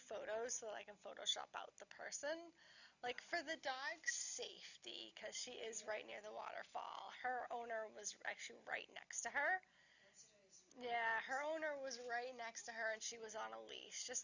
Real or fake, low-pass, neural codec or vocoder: real; 7.2 kHz; none